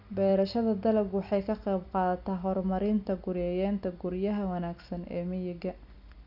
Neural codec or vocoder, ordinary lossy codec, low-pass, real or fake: none; none; 5.4 kHz; real